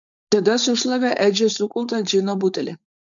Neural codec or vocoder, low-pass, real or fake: codec, 16 kHz, 4.8 kbps, FACodec; 7.2 kHz; fake